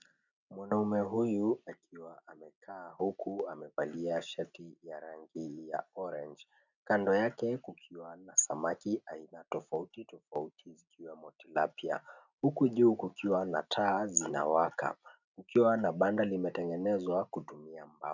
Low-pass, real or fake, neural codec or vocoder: 7.2 kHz; real; none